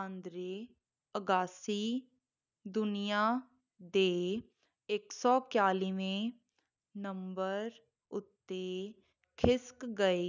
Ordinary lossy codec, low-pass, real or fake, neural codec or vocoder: none; 7.2 kHz; real; none